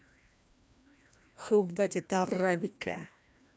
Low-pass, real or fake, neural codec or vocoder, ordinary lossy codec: none; fake; codec, 16 kHz, 1 kbps, FreqCodec, larger model; none